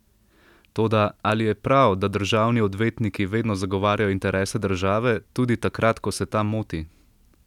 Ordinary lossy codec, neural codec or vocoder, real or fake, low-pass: none; none; real; 19.8 kHz